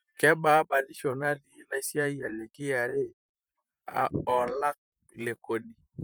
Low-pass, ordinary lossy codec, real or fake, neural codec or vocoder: none; none; fake; vocoder, 44.1 kHz, 128 mel bands, Pupu-Vocoder